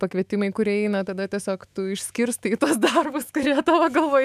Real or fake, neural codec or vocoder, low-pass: fake; autoencoder, 48 kHz, 128 numbers a frame, DAC-VAE, trained on Japanese speech; 14.4 kHz